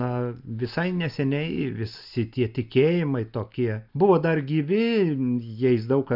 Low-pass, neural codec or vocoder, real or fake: 5.4 kHz; none; real